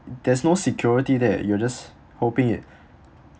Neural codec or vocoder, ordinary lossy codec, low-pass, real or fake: none; none; none; real